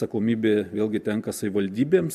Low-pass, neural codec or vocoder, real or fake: 14.4 kHz; none; real